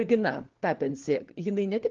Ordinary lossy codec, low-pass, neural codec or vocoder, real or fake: Opus, 16 kbps; 7.2 kHz; codec, 16 kHz, 4 kbps, FunCodec, trained on LibriTTS, 50 frames a second; fake